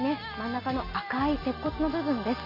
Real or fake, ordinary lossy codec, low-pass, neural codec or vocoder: real; MP3, 32 kbps; 5.4 kHz; none